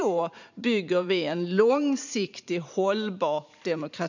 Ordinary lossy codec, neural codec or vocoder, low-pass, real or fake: none; vocoder, 44.1 kHz, 128 mel bands every 512 samples, BigVGAN v2; 7.2 kHz; fake